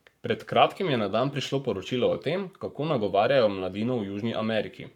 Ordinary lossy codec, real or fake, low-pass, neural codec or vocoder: none; fake; 19.8 kHz; codec, 44.1 kHz, 7.8 kbps, Pupu-Codec